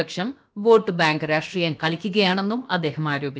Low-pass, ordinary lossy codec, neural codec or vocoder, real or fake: none; none; codec, 16 kHz, about 1 kbps, DyCAST, with the encoder's durations; fake